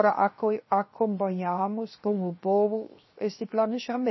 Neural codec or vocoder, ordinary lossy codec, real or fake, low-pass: codec, 16 kHz, 0.7 kbps, FocalCodec; MP3, 24 kbps; fake; 7.2 kHz